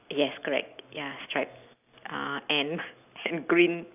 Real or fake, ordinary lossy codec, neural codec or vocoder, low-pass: real; none; none; 3.6 kHz